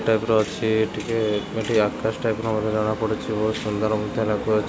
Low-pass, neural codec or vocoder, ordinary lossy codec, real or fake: none; none; none; real